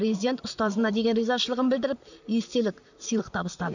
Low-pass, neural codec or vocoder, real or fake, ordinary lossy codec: 7.2 kHz; vocoder, 44.1 kHz, 128 mel bands, Pupu-Vocoder; fake; none